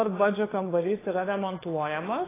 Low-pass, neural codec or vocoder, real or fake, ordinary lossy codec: 3.6 kHz; codec, 16 kHz, 4 kbps, FunCodec, trained on LibriTTS, 50 frames a second; fake; AAC, 16 kbps